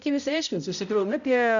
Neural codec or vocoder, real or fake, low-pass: codec, 16 kHz, 0.5 kbps, X-Codec, HuBERT features, trained on balanced general audio; fake; 7.2 kHz